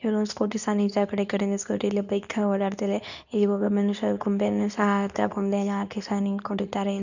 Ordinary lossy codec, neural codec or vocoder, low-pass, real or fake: none; codec, 24 kHz, 0.9 kbps, WavTokenizer, medium speech release version 2; 7.2 kHz; fake